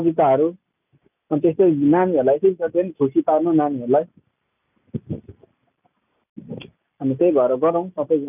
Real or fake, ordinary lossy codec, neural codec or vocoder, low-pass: real; none; none; 3.6 kHz